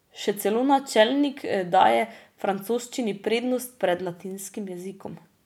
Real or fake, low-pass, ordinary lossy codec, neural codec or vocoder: real; 19.8 kHz; none; none